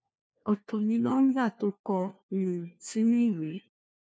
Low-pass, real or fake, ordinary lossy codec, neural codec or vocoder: none; fake; none; codec, 16 kHz, 1 kbps, FunCodec, trained on LibriTTS, 50 frames a second